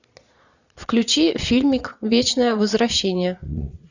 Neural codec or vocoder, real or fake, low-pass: vocoder, 22.05 kHz, 80 mel bands, Vocos; fake; 7.2 kHz